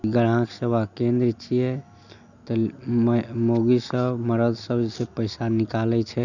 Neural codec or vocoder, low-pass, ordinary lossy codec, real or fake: none; 7.2 kHz; none; real